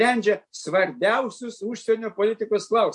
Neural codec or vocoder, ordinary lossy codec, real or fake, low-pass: none; MP3, 64 kbps; real; 10.8 kHz